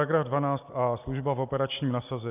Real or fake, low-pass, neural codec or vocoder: real; 3.6 kHz; none